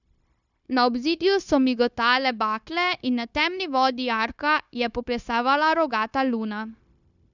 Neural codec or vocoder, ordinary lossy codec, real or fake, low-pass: codec, 16 kHz, 0.9 kbps, LongCat-Audio-Codec; none; fake; 7.2 kHz